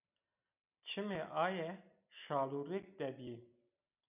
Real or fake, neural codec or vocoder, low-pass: real; none; 3.6 kHz